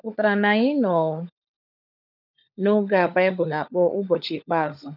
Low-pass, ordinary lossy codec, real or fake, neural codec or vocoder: 5.4 kHz; none; fake; codec, 16 kHz, 16 kbps, FunCodec, trained on LibriTTS, 50 frames a second